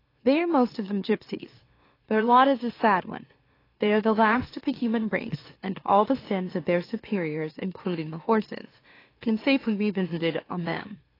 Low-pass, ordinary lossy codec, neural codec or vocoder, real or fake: 5.4 kHz; AAC, 24 kbps; autoencoder, 44.1 kHz, a latent of 192 numbers a frame, MeloTTS; fake